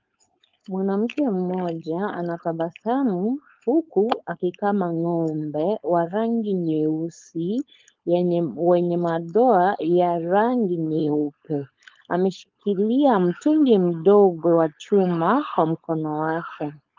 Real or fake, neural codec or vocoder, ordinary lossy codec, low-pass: fake; codec, 16 kHz, 4.8 kbps, FACodec; Opus, 32 kbps; 7.2 kHz